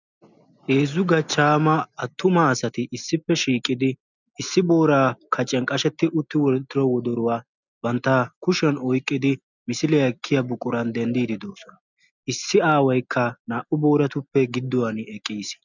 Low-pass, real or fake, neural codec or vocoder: 7.2 kHz; real; none